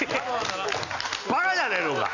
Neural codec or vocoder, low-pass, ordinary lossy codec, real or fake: none; 7.2 kHz; none; real